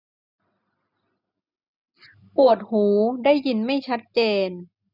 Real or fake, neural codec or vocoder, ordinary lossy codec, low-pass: real; none; none; 5.4 kHz